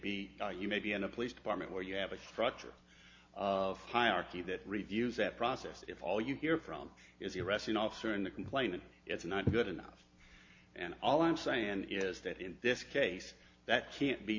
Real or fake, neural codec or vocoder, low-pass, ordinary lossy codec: real; none; 7.2 kHz; MP3, 48 kbps